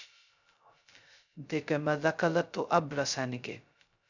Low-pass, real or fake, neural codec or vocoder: 7.2 kHz; fake; codec, 16 kHz, 0.2 kbps, FocalCodec